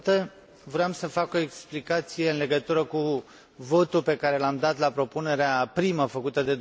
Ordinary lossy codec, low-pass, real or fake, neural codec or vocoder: none; none; real; none